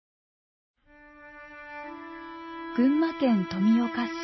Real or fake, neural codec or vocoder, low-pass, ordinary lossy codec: real; none; 7.2 kHz; MP3, 24 kbps